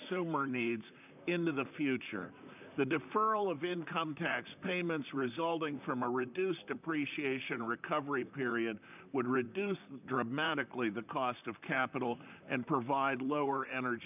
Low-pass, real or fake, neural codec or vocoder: 3.6 kHz; fake; vocoder, 44.1 kHz, 128 mel bands, Pupu-Vocoder